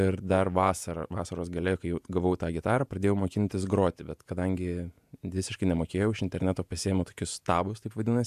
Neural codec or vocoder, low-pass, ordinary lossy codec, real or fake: vocoder, 48 kHz, 128 mel bands, Vocos; 14.4 kHz; AAC, 96 kbps; fake